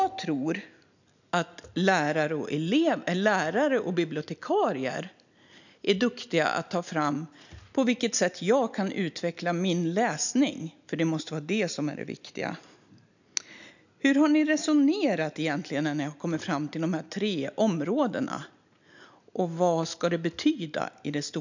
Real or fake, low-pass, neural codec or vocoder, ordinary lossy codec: real; 7.2 kHz; none; none